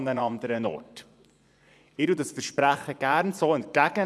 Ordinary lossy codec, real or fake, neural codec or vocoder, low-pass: none; fake; vocoder, 24 kHz, 100 mel bands, Vocos; none